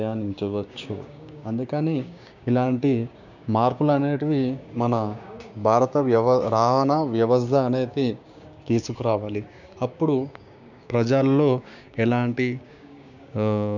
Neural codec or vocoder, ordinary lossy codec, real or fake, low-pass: codec, 16 kHz, 6 kbps, DAC; none; fake; 7.2 kHz